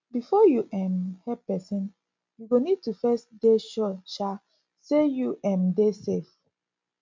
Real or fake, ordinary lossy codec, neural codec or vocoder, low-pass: real; MP3, 64 kbps; none; 7.2 kHz